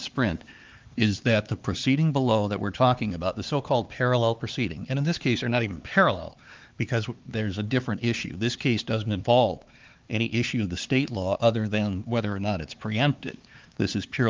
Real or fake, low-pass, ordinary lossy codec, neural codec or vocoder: fake; 7.2 kHz; Opus, 32 kbps; codec, 16 kHz, 4 kbps, X-Codec, HuBERT features, trained on LibriSpeech